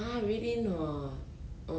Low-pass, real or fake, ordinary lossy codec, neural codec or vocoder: none; real; none; none